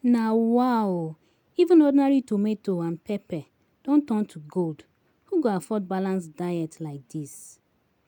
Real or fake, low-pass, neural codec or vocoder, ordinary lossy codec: real; 19.8 kHz; none; none